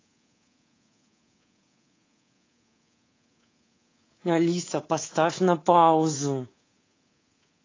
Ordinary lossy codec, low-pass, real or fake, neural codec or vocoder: AAC, 32 kbps; 7.2 kHz; fake; codec, 24 kHz, 3.1 kbps, DualCodec